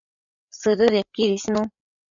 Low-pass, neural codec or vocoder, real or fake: 7.2 kHz; codec, 16 kHz, 8 kbps, FreqCodec, larger model; fake